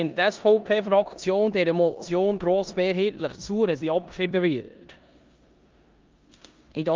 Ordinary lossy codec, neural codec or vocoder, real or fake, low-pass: Opus, 24 kbps; codec, 16 kHz in and 24 kHz out, 0.9 kbps, LongCat-Audio-Codec, four codebook decoder; fake; 7.2 kHz